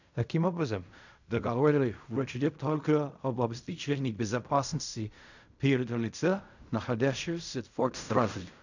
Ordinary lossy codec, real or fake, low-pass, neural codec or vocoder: none; fake; 7.2 kHz; codec, 16 kHz in and 24 kHz out, 0.4 kbps, LongCat-Audio-Codec, fine tuned four codebook decoder